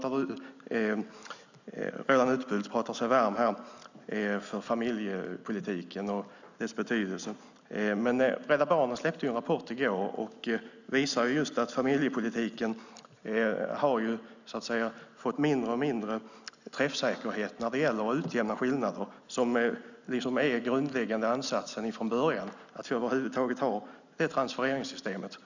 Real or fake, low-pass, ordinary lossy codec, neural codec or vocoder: real; 7.2 kHz; none; none